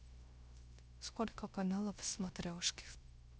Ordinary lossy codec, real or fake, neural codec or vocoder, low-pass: none; fake; codec, 16 kHz, 0.3 kbps, FocalCodec; none